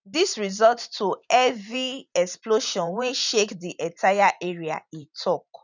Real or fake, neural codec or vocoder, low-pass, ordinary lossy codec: fake; vocoder, 44.1 kHz, 128 mel bands every 512 samples, BigVGAN v2; 7.2 kHz; none